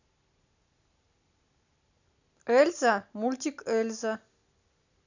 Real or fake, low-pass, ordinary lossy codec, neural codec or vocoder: real; 7.2 kHz; none; none